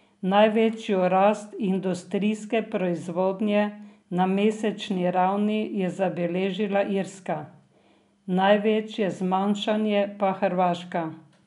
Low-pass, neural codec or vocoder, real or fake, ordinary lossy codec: 10.8 kHz; none; real; none